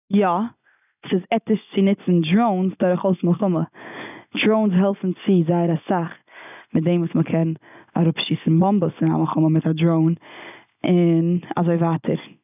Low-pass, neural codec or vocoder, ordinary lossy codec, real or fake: 3.6 kHz; none; none; real